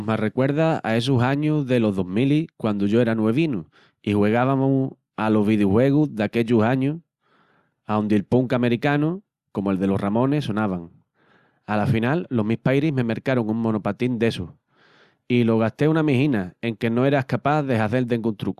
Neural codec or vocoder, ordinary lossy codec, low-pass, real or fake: none; Opus, 64 kbps; 14.4 kHz; real